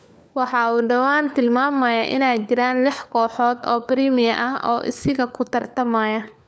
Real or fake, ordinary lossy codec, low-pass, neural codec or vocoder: fake; none; none; codec, 16 kHz, 4 kbps, FunCodec, trained on LibriTTS, 50 frames a second